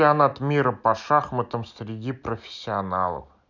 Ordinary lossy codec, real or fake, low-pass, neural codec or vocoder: none; real; 7.2 kHz; none